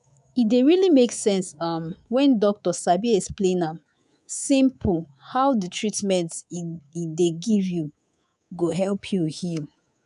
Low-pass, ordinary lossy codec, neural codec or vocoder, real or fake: 10.8 kHz; none; codec, 24 kHz, 3.1 kbps, DualCodec; fake